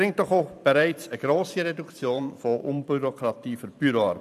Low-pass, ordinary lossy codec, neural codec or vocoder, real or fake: 14.4 kHz; none; vocoder, 44.1 kHz, 128 mel bands every 512 samples, BigVGAN v2; fake